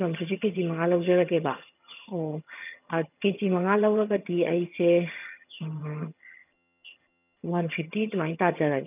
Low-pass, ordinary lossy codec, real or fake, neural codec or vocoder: 3.6 kHz; none; fake; vocoder, 22.05 kHz, 80 mel bands, HiFi-GAN